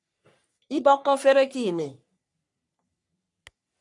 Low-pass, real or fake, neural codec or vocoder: 10.8 kHz; fake; codec, 44.1 kHz, 3.4 kbps, Pupu-Codec